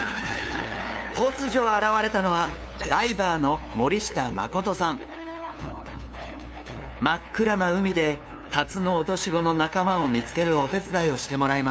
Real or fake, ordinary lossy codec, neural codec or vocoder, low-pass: fake; none; codec, 16 kHz, 2 kbps, FunCodec, trained on LibriTTS, 25 frames a second; none